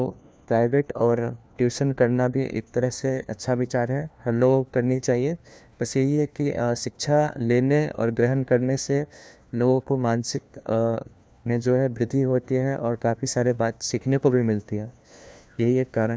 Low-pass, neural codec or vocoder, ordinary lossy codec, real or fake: none; codec, 16 kHz, 1 kbps, FunCodec, trained on LibriTTS, 50 frames a second; none; fake